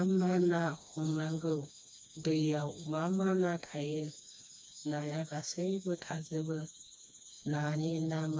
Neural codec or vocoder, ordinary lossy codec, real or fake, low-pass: codec, 16 kHz, 2 kbps, FreqCodec, smaller model; none; fake; none